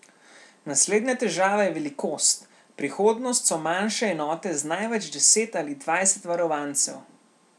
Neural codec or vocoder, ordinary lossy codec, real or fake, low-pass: none; none; real; none